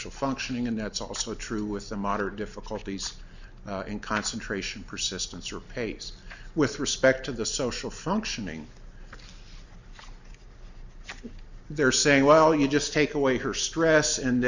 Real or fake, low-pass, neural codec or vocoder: real; 7.2 kHz; none